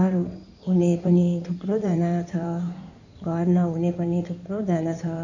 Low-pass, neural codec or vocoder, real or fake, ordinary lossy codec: 7.2 kHz; codec, 16 kHz in and 24 kHz out, 2.2 kbps, FireRedTTS-2 codec; fake; none